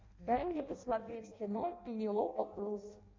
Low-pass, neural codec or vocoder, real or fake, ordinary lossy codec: 7.2 kHz; codec, 16 kHz in and 24 kHz out, 0.6 kbps, FireRedTTS-2 codec; fake; MP3, 48 kbps